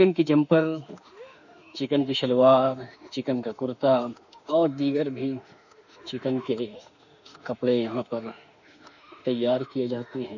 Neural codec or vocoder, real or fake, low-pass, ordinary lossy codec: autoencoder, 48 kHz, 32 numbers a frame, DAC-VAE, trained on Japanese speech; fake; 7.2 kHz; none